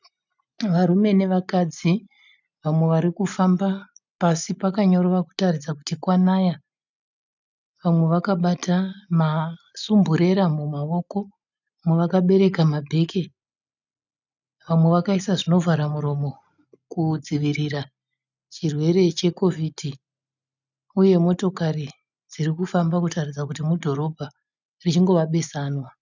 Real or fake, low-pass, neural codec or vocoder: real; 7.2 kHz; none